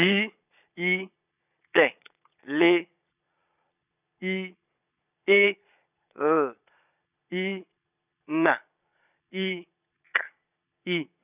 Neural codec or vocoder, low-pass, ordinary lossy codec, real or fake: vocoder, 22.05 kHz, 80 mel bands, Vocos; 3.6 kHz; none; fake